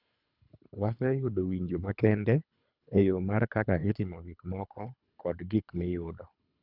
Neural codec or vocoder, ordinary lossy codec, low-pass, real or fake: codec, 24 kHz, 3 kbps, HILCodec; none; 5.4 kHz; fake